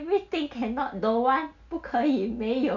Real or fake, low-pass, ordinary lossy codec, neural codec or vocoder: real; 7.2 kHz; none; none